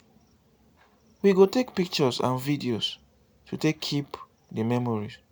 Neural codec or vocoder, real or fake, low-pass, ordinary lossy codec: none; real; none; none